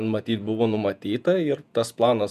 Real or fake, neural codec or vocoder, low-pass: fake; vocoder, 48 kHz, 128 mel bands, Vocos; 14.4 kHz